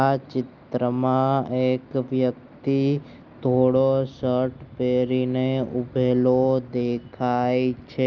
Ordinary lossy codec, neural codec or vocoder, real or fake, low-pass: Opus, 24 kbps; none; real; 7.2 kHz